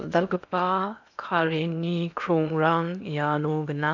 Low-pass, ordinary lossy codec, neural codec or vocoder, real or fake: 7.2 kHz; none; codec, 16 kHz in and 24 kHz out, 0.8 kbps, FocalCodec, streaming, 65536 codes; fake